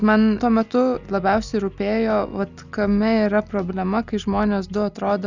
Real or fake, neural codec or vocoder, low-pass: real; none; 7.2 kHz